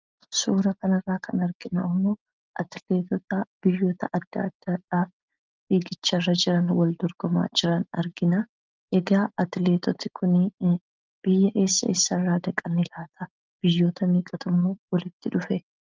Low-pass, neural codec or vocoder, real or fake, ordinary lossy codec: 7.2 kHz; none; real; Opus, 24 kbps